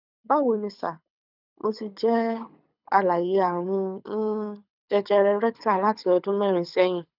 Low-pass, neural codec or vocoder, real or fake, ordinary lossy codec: 5.4 kHz; codec, 24 kHz, 6 kbps, HILCodec; fake; none